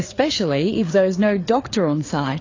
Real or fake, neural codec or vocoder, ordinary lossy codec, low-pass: fake; codec, 16 kHz, 4 kbps, FreqCodec, larger model; AAC, 32 kbps; 7.2 kHz